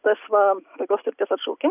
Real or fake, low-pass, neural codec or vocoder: real; 3.6 kHz; none